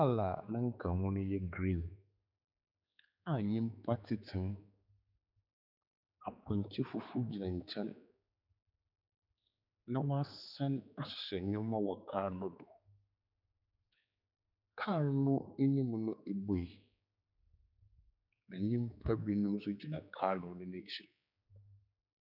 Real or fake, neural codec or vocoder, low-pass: fake; codec, 16 kHz, 4 kbps, X-Codec, HuBERT features, trained on general audio; 5.4 kHz